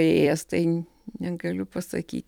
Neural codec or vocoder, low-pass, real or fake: vocoder, 44.1 kHz, 128 mel bands every 256 samples, BigVGAN v2; 19.8 kHz; fake